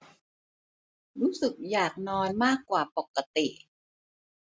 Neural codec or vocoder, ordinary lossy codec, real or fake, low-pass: none; none; real; none